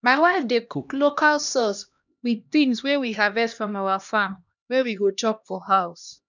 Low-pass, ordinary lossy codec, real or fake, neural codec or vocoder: 7.2 kHz; none; fake; codec, 16 kHz, 1 kbps, X-Codec, HuBERT features, trained on LibriSpeech